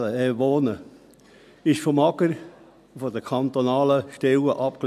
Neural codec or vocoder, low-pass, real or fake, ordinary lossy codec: none; 14.4 kHz; real; none